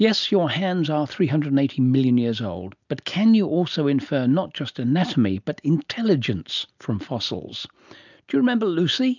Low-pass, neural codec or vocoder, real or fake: 7.2 kHz; none; real